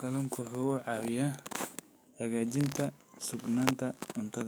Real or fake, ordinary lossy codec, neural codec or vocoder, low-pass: fake; none; codec, 44.1 kHz, 7.8 kbps, DAC; none